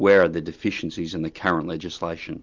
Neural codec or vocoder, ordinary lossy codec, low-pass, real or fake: none; Opus, 24 kbps; 7.2 kHz; real